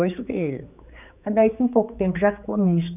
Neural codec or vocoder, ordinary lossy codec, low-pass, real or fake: codec, 16 kHz, 4 kbps, X-Codec, HuBERT features, trained on general audio; none; 3.6 kHz; fake